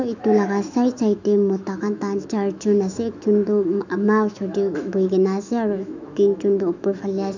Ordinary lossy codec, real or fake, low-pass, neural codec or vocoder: none; fake; 7.2 kHz; autoencoder, 48 kHz, 128 numbers a frame, DAC-VAE, trained on Japanese speech